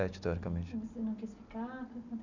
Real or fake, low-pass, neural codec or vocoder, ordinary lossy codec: real; 7.2 kHz; none; none